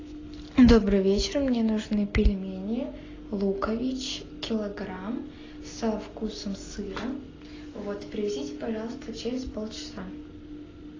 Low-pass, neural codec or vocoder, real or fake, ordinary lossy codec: 7.2 kHz; none; real; AAC, 32 kbps